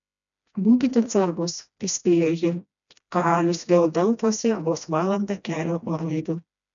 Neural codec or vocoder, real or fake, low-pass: codec, 16 kHz, 1 kbps, FreqCodec, smaller model; fake; 7.2 kHz